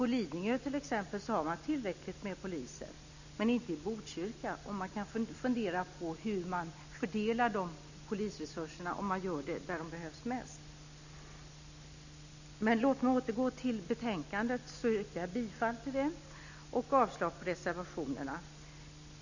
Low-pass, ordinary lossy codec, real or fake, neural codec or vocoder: 7.2 kHz; none; real; none